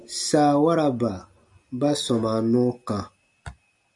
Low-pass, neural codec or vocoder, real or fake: 10.8 kHz; none; real